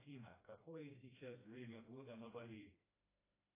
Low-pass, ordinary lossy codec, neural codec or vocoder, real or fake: 3.6 kHz; AAC, 16 kbps; codec, 16 kHz, 1 kbps, FreqCodec, smaller model; fake